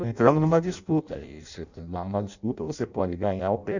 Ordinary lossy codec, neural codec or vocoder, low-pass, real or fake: none; codec, 16 kHz in and 24 kHz out, 0.6 kbps, FireRedTTS-2 codec; 7.2 kHz; fake